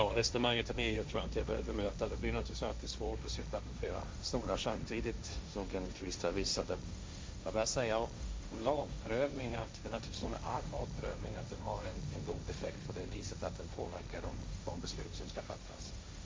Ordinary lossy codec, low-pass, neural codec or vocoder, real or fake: none; none; codec, 16 kHz, 1.1 kbps, Voila-Tokenizer; fake